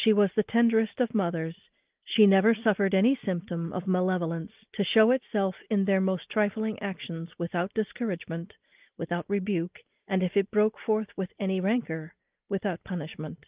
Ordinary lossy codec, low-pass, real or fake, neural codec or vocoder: Opus, 32 kbps; 3.6 kHz; real; none